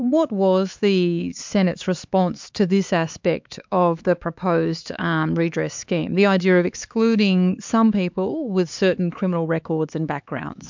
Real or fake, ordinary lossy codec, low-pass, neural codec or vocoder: fake; MP3, 64 kbps; 7.2 kHz; codec, 16 kHz, 4 kbps, X-Codec, HuBERT features, trained on LibriSpeech